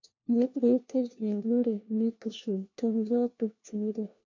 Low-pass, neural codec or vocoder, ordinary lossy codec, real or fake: 7.2 kHz; codec, 16 kHz, 1 kbps, FunCodec, trained on LibriTTS, 50 frames a second; MP3, 64 kbps; fake